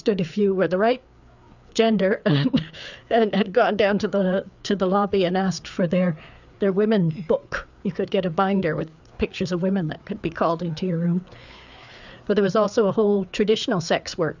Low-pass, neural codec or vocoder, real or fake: 7.2 kHz; codec, 16 kHz, 4 kbps, FreqCodec, larger model; fake